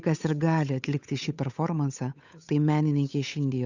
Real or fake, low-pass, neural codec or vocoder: fake; 7.2 kHz; codec, 16 kHz, 8 kbps, FunCodec, trained on Chinese and English, 25 frames a second